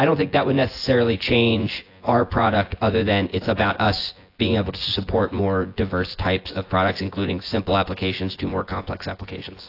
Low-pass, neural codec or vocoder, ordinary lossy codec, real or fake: 5.4 kHz; vocoder, 24 kHz, 100 mel bands, Vocos; AAC, 32 kbps; fake